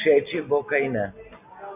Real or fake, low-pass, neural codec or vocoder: real; 3.6 kHz; none